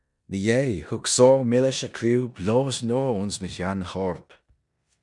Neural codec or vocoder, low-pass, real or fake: codec, 16 kHz in and 24 kHz out, 0.9 kbps, LongCat-Audio-Codec, four codebook decoder; 10.8 kHz; fake